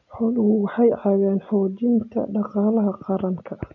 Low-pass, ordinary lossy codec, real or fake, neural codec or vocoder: 7.2 kHz; none; real; none